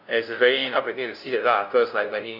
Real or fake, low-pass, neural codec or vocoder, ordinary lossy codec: fake; 5.4 kHz; codec, 16 kHz, 0.5 kbps, FunCodec, trained on LibriTTS, 25 frames a second; none